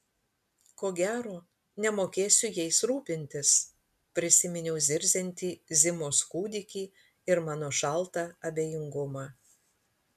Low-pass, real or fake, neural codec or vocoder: 14.4 kHz; real; none